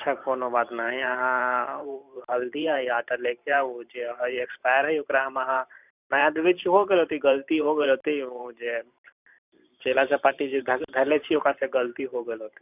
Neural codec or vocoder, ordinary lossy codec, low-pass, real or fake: vocoder, 44.1 kHz, 128 mel bands every 512 samples, BigVGAN v2; none; 3.6 kHz; fake